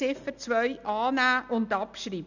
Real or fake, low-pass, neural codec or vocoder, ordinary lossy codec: real; 7.2 kHz; none; none